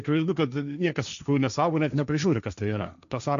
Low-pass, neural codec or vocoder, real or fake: 7.2 kHz; codec, 16 kHz, 1.1 kbps, Voila-Tokenizer; fake